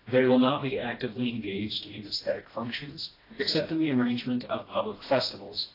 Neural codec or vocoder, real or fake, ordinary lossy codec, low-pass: codec, 16 kHz, 1 kbps, FreqCodec, smaller model; fake; AAC, 24 kbps; 5.4 kHz